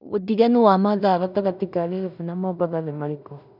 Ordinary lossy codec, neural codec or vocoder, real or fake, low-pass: none; codec, 16 kHz in and 24 kHz out, 0.4 kbps, LongCat-Audio-Codec, two codebook decoder; fake; 5.4 kHz